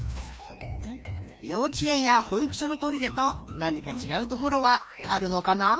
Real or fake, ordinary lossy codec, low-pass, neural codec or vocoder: fake; none; none; codec, 16 kHz, 1 kbps, FreqCodec, larger model